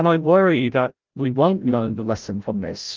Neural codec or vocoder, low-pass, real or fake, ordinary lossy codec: codec, 16 kHz, 0.5 kbps, FreqCodec, larger model; 7.2 kHz; fake; Opus, 32 kbps